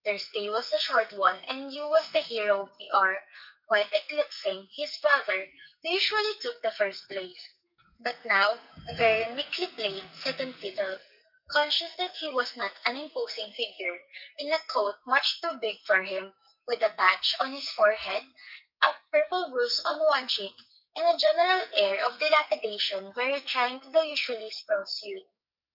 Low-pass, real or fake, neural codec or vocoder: 5.4 kHz; fake; codec, 44.1 kHz, 2.6 kbps, SNAC